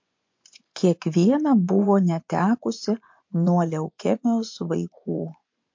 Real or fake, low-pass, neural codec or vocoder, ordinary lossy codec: fake; 7.2 kHz; vocoder, 24 kHz, 100 mel bands, Vocos; MP3, 48 kbps